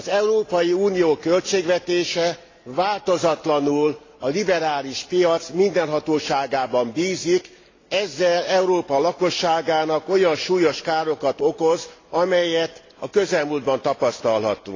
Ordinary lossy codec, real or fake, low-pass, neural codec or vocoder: AAC, 32 kbps; real; 7.2 kHz; none